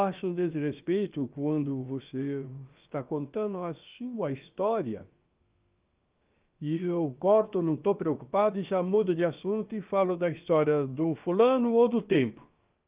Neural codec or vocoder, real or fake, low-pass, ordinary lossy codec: codec, 16 kHz, about 1 kbps, DyCAST, with the encoder's durations; fake; 3.6 kHz; Opus, 24 kbps